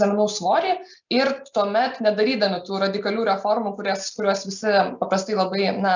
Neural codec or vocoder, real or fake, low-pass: none; real; 7.2 kHz